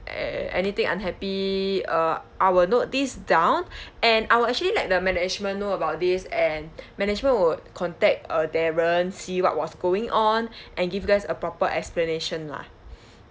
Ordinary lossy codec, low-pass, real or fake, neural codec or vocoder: none; none; real; none